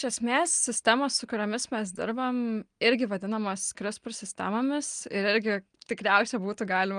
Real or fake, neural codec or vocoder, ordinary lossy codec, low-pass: real; none; Opus, 32 kbps; 9.9 kHz